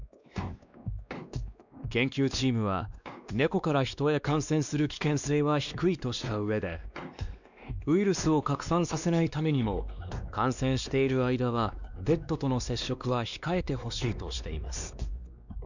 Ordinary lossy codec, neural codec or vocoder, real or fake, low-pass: none; codec, 16 kHz, 2 kbps, X-Codec, WavLM features, trained on Multilingual LibriSpeech; fake; 7.2 kHz